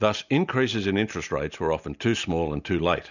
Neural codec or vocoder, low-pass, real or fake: none; 7.2 kHz; real